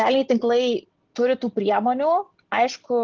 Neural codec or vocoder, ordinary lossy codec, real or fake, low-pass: none; Opus, 16 kbps; real; 7.2 kHz